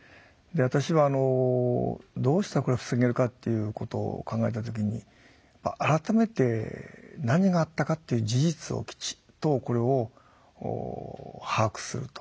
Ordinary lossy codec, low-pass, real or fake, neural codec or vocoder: none; none; real; none